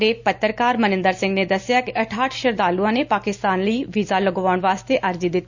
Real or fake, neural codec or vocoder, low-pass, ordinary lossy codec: real; none; 7.2 kHz; Opus, 64 kbps